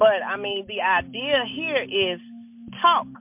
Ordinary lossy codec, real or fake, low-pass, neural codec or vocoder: MP3, 32 kbps; real; 3.6 kHz; none